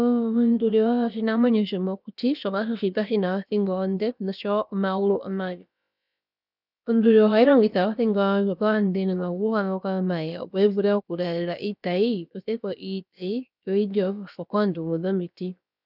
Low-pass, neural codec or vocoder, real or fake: 5.4 kHz; codec, 16 kHz, about 1 kbps, DyCAST, with the encoder's durations; fake